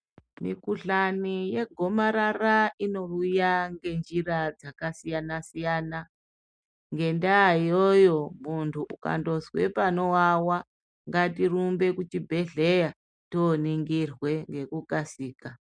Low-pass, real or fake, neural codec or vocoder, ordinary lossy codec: 9.9 kHz; real; none; MP3, 96 kbps